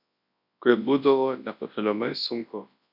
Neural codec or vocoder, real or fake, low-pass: codec, 24 kHz, 0.9 kbps, WavTokenizer, large speech release; fake; 5.4 kHz